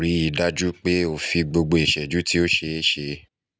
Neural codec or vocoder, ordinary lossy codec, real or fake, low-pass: none; none; real; none